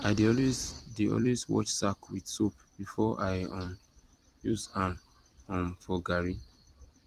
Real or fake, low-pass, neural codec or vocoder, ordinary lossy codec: fake; 14.4 kHz; vocoder, 44.1 kHz, 128 mel bands every 256 samples, BigVGAN v2; Opus, 24 kbps